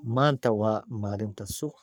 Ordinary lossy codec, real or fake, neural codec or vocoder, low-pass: none; fake; codec, 44.1 kHz, 3.4 kbps, Pupu-Codec; none